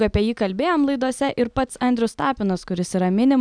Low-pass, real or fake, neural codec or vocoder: 9.9 kHz; real; none